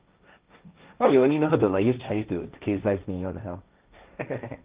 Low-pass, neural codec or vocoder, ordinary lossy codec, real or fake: 3.6 kHz; codec, 16 kHz, 1.1 kbps, Voila-Tokenizer; Opus, 32 kbps; fake